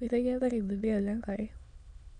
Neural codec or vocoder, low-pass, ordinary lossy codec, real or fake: autoencoder, 22.05 kHz, a latent of 192 numbers a frame, VITS, trained on many speakers; 9.9 kHz; none; fake